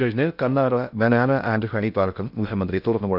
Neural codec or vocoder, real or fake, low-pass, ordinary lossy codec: codec, 16 kHz in and 24 kHz out, 0.6 kbps, FocalCodec, streaming, 2048 codes; fake; 5.4 kHz; none